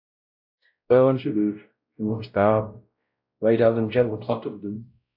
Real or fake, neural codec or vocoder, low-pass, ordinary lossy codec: fake; codec, 16 kHz, 0.5 kbps, X-Codec, WavLM features, trained on Multilingual LibriSpeech; 5.4 kHz; AAC, 48 kbps